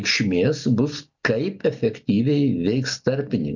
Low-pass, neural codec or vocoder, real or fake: 7.2 kHz; none; real